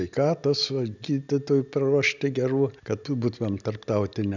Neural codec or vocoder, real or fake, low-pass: none; real; 7.2 kHz